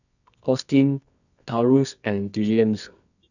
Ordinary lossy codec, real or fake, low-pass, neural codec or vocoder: none; fake; 7.2 kHz; codec, 24 kHz, 0.9 kbps, WavTokenizer, medium music audio release